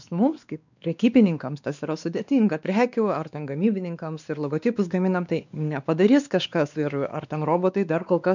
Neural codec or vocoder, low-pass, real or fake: codec, 16 kHz, 2 kbps, X-Codec, WavLM features, trained on Multilingual LibriSpeech; 7.2 kHz; fake